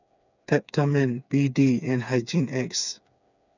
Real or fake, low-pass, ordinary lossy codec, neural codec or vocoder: fake; 7.2 kHz; none; codec, 16 kHz, 4 kbps, FreqCodec, smaller model